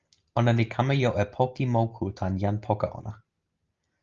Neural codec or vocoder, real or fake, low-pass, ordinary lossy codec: none; real; 7.2 kHz; Opus, 32 kbps